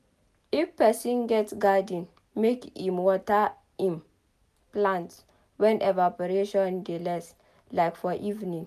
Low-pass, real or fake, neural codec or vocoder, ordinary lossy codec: 14.4 kHz; real; none; none